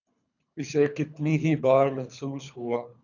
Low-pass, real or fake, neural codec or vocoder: 7.2 kHz; fake; codec, 24 kHz, 3 kbps, HILCodec